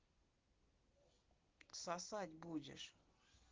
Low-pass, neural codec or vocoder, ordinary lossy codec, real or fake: 7.2 kHz; none; Opus, 16 kbps; real